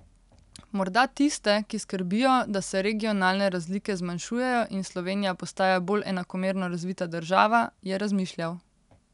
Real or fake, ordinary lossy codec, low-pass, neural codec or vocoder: real; none; 10.8 kHz; none